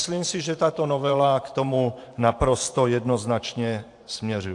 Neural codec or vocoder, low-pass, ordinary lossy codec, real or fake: vocoder, 44.1 kHz, 128 mel bands every 512 samples, BigVGAN v2; 10.8 kHz; AAC, 64 kbps; fake